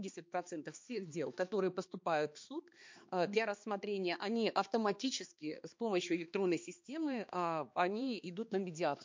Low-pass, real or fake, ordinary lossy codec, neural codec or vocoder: 7.2 kHz; fake; MP3, 48 kbps; codec, 16 kHz, 2 kbps, X-Codec, HuBERT features, trained on balanced general audio